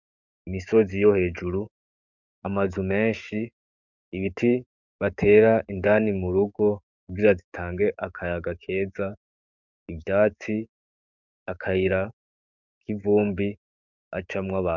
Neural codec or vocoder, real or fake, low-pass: codec, 44.1 kHz, 7.8 kbps, DAC; fake; 7.2 kHz